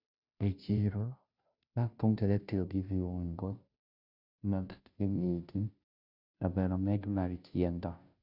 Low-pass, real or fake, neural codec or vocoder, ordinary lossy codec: 5.4 kHz; fake; codec, 16 kHz, 0.5 kbps, FunCodec, trained on Chinese and English, 25 frames a second; Opus, 64 kbps